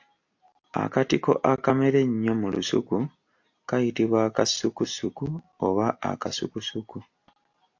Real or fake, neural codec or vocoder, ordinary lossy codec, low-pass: real; none; AAC, 48 kbps; 7.2 kHz